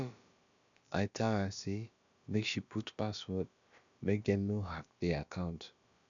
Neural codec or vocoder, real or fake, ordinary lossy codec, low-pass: codec, 16 kHz, about 1 kbps, DyCAST, with the encoder's durations; fake; none; 7.2 kHz